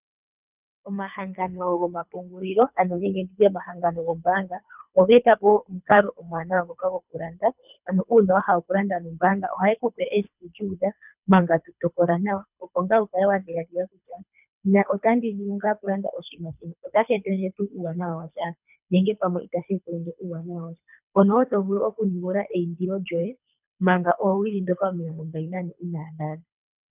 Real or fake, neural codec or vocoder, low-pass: fake; codec, 24 kHz, 3 kbps, HILCodec; 3.6 kHz